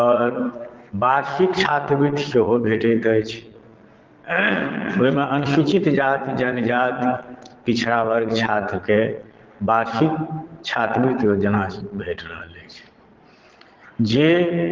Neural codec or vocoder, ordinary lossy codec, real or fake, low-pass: vocoder, 22.05 kHz, 80 mel bands, Vocos; Opus, 16 kbps; fake; 7.2 kHz